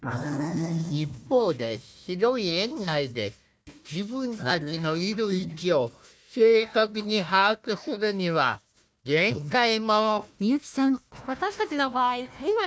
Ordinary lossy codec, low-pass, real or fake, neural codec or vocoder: none; none; fake; codec, 16 kHz, 1 kbps, FunCodec, trained on Chinese and English, 50 frames a second